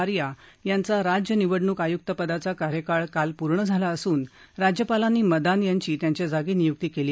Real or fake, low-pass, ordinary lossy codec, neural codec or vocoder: real; none; none; none